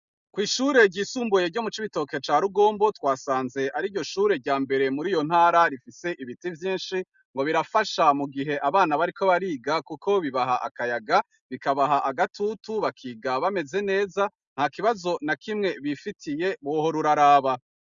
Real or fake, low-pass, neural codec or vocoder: real; 7.2 kHz; none